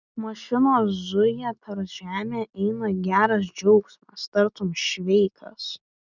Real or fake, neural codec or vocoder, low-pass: real; none; 7.2 kHz